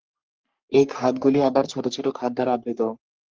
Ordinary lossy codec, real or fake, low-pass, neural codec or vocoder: Opus, 32 kbps; fake; 7.2 kHz; codec, 44.1 kHz, 3.4 kbps, Pupu-Codec